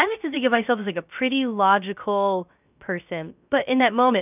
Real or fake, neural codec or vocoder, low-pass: fake; codec, 16 kHz, 0.3 kbps, FocalCodec; 3.6 kHz